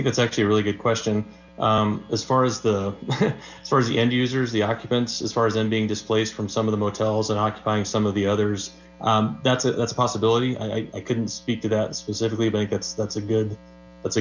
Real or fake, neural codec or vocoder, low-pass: real; none; 7.2 kHz